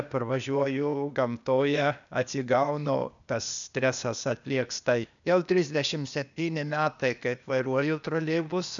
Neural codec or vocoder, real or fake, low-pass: codec, 16 kHz, 0.8 kbps, ZipCodec; fake; 7.2 kHz